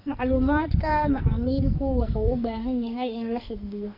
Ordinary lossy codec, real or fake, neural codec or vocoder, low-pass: MP3, 48 kbps; fake; codec, 44.1 kHz, 2.6 kbps, SNAC; 5.4 kHz